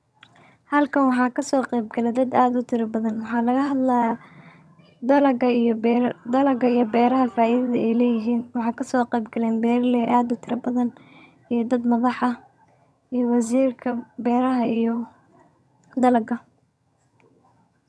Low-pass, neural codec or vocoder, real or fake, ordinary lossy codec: none; vocoder, 22.05 kHz, 80 mel bands, HiFi-GAN; fake; none